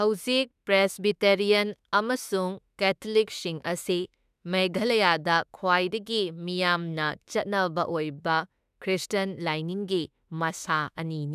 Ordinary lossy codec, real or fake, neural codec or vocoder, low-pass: none; fake; autoencoder, 48 kHz, 32 numbers a frame, DAC-VAE, trained on Japanese speech; 14.4 kHz